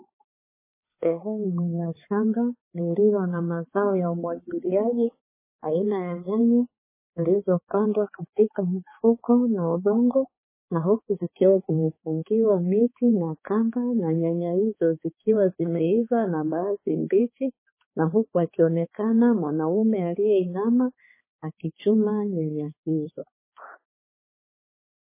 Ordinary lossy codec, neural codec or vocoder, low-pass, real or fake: MP3, 16 kbps; codec, 16 kHz, 4 kbps, X-Codec, HuBERT features, trained on balanced general audio; 3.6 kHz; fake